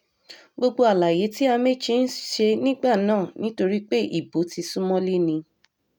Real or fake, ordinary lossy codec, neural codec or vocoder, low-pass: real; none; none; 19.8 kHz